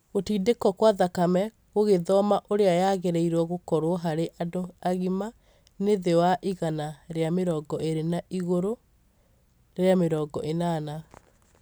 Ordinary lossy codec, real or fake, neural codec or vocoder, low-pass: none; real; none; none